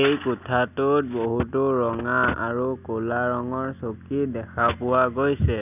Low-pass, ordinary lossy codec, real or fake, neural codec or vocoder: 3.6 kHz; none; real; none